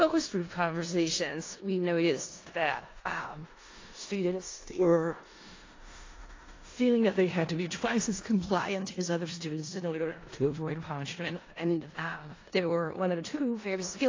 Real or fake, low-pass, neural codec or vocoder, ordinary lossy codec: fake; 7.2 kHz; codec, 16 kHz in and 24 kHz out, 0.4 kbps, LongCat-Audio-Codec, four codebook decoder; AAC, 32 kbps